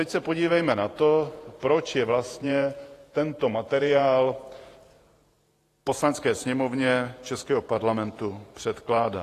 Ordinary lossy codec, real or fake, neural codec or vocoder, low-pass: AAC, 48 kbps; fake; vocoder, 48 kHz, 128 mel bands, Vocos; 14.4 kHz